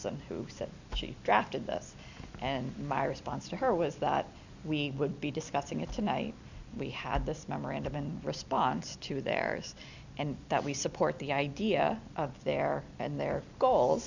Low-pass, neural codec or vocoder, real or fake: 7.2 kHz; none; real